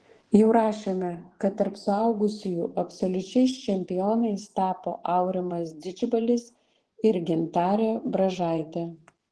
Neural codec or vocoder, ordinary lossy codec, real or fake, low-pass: vocoder, 24 kHz, 100 mel bands, Vocos; Opus, 16 kbps; fake; 10.8 kHz